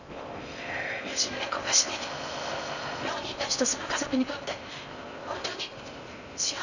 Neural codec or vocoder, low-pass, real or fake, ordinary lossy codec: codec, 16 kHz in and 24 kHz out, 0.6 kbps, FocalCodec, streaming, 4096 codes; 7.2 kHz; fake; none